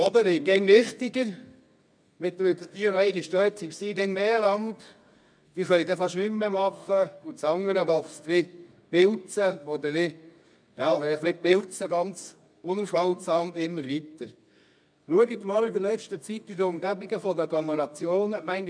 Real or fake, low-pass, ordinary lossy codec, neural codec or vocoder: fake; 9.9 kHz; MP3, 96 kbps; codec, 24 kHz, 0.9 kbps, WavTokenizer, medium music audio release